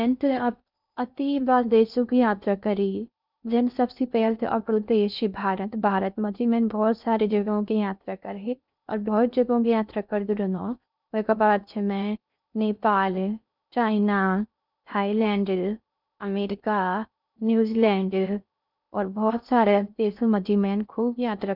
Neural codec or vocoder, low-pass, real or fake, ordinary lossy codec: codec, 16 kHz in and 24 kHz out, 0.6 kbps, FocalCodec, streaming, 2048 codes; 5.4 kHz; fake; none